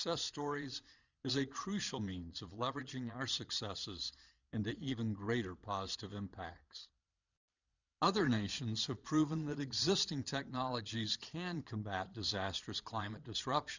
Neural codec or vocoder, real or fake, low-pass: vocoder, 22.05 kHz, 80 mel bands, Vocos; fake; 7.2 kHz